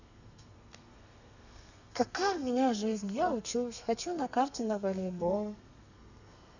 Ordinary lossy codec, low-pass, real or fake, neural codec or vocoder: none; 7.2 kHz; fake; codec, 32 kHz, 1.9 kbps, SNAC